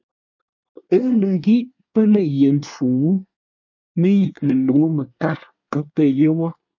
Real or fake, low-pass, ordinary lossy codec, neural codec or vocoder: fake; 7.2 kHz; MP3, 64 kbps; codec, 24 kHz, 1 kbps, SNAC